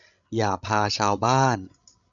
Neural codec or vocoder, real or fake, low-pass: codec, 16 kHz, 16 kbps, FreqCodec, larger model; fake; 7.2 kHz